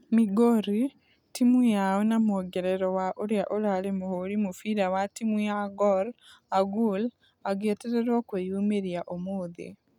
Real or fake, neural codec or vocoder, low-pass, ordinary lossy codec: real; none; 19.8 kHz; none